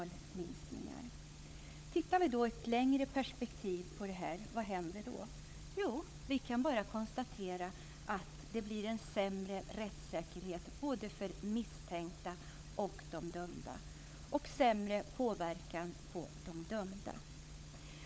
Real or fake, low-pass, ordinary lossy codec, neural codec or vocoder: fake; none; none; codec, 16 kHz, 16 kbps, FunCodec, trained on LibriTTS, 50 frames a second